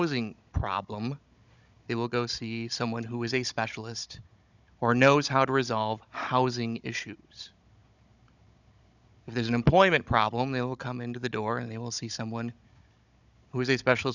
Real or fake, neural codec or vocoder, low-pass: fake; codec, 16 kHz, 16 kbps, FunCodec, trained on Chinese and English, 50 frames a second; 7.2 kHz